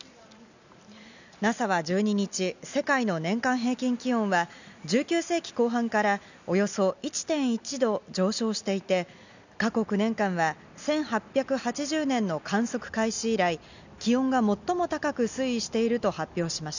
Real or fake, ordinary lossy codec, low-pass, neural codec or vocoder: real; none; 7.2 kHz; none